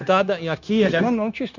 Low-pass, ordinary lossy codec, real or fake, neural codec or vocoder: 7.2 kHz; none; fake; codec, 16 kHz, 0.9 kbps, LongCat-Audio-Codec